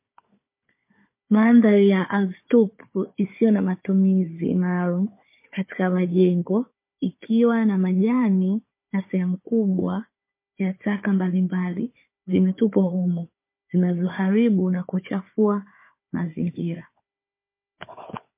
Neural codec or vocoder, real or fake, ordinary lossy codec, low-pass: codec, 16 kHz, 4 kbps, FunCodec, trained on Chinese and English, 50 frames a second; fake; MP3, 24 kbps; 3.6 kHz